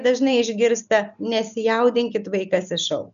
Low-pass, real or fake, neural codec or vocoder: 7.2 kHz; real; none